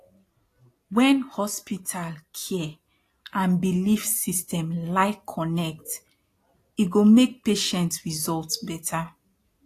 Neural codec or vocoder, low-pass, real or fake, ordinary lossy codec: none; 14.4 kHz; real; AAC, 48 kbps